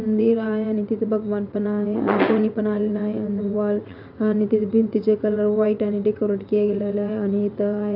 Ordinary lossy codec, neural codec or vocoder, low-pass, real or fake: none; vocoder, 22.05 kHz, 80 mel bands, WaveNeXt; 5.4 kHz; fake